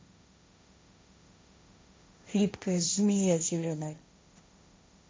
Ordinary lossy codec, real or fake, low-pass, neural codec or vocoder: none; fake; none; codec, 16 kHz, 1.1 kbps, Voila-Tokenizer